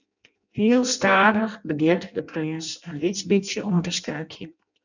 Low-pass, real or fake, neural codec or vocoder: 7.2 kHz; fake; codec, 16 kHz in and 24 kHz out, 0.6 kbps, FireRedTTS-2 codec